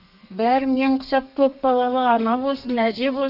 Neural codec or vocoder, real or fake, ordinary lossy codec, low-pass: codec, 32 kHz, 1.9 kbps, SNAC; fake; none; 5.4 kHz